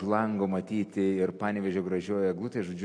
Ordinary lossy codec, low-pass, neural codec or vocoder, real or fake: AAC, 48 kbps; 9.9 kHz; none; real